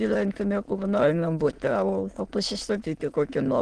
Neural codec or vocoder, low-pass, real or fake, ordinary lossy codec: autoencoder, 22.05 kHz, a latent of 192 numbers a frame, VITS, trained on many speakers; 9.9 kHz; fake; Opus, 16 kbps